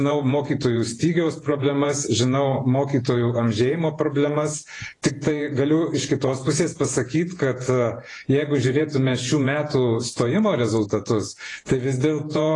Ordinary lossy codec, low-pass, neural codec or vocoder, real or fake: AAC, 32 kbps; 10.8 kHz; vocoder, 48 kHz, 128 mel bands, Vocos; fake